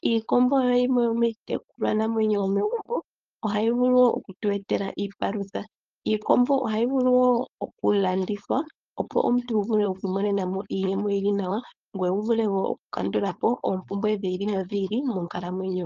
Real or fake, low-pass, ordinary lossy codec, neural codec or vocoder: fake; 7.2 kHz; Opus, 24 kbps; codec, 16 kHz, 4.8 kbps, FACodec